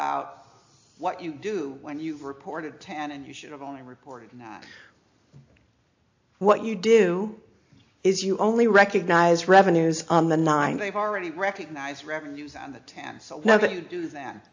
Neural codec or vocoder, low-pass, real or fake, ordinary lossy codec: none; 7.2 kHz; real; AAC, 48 kbps